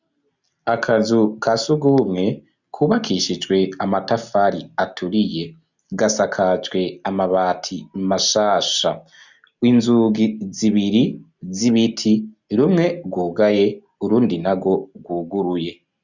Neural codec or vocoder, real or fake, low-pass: none; real; 7.2 kHz